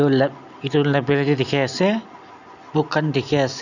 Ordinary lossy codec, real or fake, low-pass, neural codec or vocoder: none; real; 7.2 kHz; none